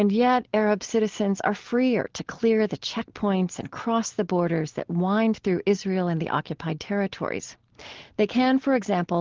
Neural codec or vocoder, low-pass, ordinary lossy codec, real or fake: none; 7.2 kHz; Opus, 16 kbps; real